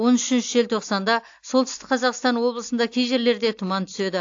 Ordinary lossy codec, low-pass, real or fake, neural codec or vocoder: AAC, 48 kbps; 7.2 kHz; real; none